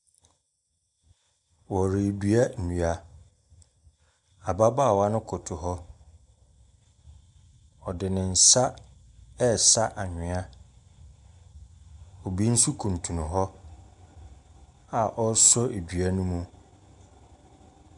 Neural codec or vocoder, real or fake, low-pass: none; real; 10.8 kHz